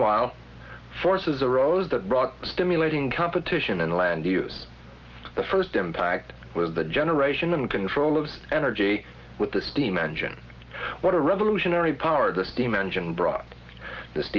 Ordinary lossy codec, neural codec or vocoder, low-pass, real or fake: Opus, 32 kbps; none; 7.2 kHz; real